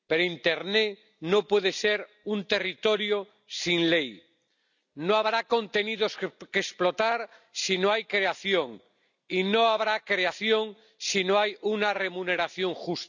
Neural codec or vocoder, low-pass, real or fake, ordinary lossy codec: none; 7.2 kHz; real; none